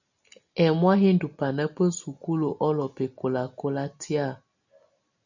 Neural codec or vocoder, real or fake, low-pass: none; real; 7.2 kHz